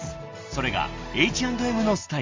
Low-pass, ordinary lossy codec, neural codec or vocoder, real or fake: 7.2 kHz; Opus, 32 kbps; none; real